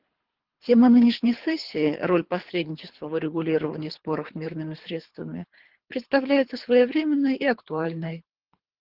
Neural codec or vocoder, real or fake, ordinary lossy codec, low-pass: codec, 24 kHz, 3 kbps, HILCodec; fake; Opus, 16 kbps; 5.4 kHz